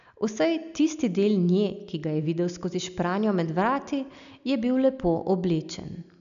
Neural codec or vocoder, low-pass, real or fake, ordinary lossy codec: none; 7.2 kHz; real; none